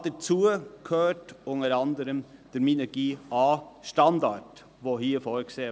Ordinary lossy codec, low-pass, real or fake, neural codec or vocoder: none; none; real; none